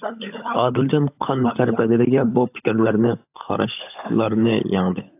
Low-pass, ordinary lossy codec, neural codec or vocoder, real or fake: 3.6 kHz; AAC, 32 kbps; codec, 16 kHz, 16 kbps, FunCodec, trained on LibriTTS, 50 frames a second; fake